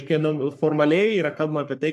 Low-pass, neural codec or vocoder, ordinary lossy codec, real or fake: 14.4 kHz; codec, 44.1 kHz, 3.4 kbps, Pupu-Codec; MP3, 96 kbps; fake